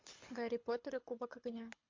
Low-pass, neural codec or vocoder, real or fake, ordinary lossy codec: 7.2 kHz; codec, 24 kHz, 6 kbps, HILCodec; fake; MP3, 48 kbps